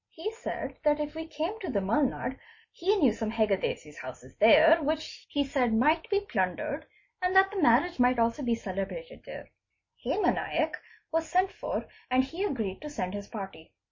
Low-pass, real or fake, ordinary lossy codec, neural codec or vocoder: 7.2 kHz; real; MP3, 32 kbps; none